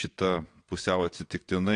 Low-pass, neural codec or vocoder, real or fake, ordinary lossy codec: 9.9 kHz; vocoder, 22.05 kHz, 80 mel bands, WaveNeXt; fake; AAC, 48 kbps